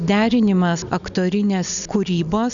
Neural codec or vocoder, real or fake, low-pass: none; real; 7.2 kHz